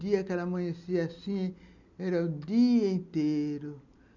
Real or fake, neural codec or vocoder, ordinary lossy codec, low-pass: real; none; none; 7.2 kHz